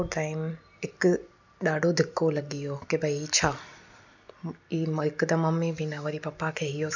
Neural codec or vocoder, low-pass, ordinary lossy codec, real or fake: none; 7.2 kHz; none; real